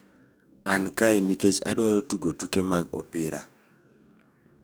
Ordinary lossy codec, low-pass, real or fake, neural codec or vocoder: none; none; fake; codec, 44.1 kHz, 2.6 kbps, DAC